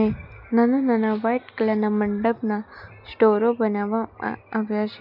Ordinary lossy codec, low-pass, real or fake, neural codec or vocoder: none; 5.4 kHz; real; none